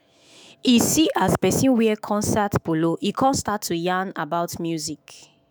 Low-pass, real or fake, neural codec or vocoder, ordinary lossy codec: none; fake; autoencoder, 48 kHz, 128 numbers a frame, DAC-VAE, trained on Japanese speech; none